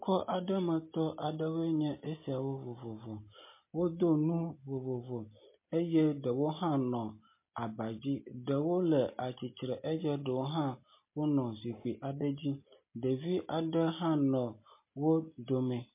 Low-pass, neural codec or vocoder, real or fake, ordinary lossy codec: 3.6 kHz; none; real; MP3, 24 kbps